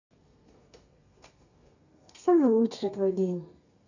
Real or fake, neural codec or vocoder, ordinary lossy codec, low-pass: fake; codec, 32 kHz, 1.9 kbps, SNAC; none; 7.2 kHz